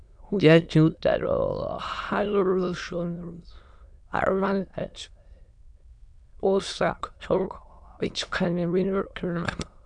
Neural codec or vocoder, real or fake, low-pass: autoencoder, 22.05 kHz, a latent of 192 numbers a frame, VITS, trained on many speakers; fake; 9.9 kHz